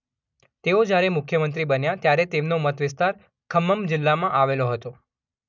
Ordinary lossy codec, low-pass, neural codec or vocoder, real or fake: none; 7.2 kHz; none; real